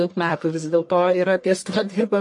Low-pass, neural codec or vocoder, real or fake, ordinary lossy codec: 10.8 kHz; codec, 44.1 kHz, 1.7 kbps, Pupu-Codec; fake; MP3, 48 kbps